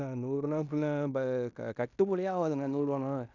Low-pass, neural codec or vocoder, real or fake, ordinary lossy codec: 7.2 kHz; codec, 16 kHz in and 24 kHz out, 0.9 kbps, LongCat-Audio-Codec, fine tuned four codebook decoder; fake; none